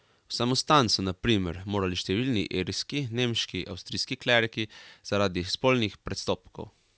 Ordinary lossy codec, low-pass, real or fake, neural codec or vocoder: none; none; real; none